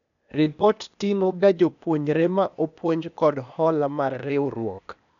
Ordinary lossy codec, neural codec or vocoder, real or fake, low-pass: none; codec, 16 kHz, 0.8 kbps, ZipCodec; fake; 7.2 kHz